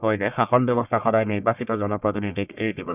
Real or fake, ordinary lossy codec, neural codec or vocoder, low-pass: fake; none; codec, 44.1 kHz, 1.7 kbps, Pupu-Codec; 3.6 kHz